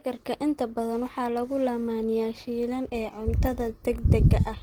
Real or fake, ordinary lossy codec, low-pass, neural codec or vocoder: real; Opus, 16 kbps; 19.8 kHz; none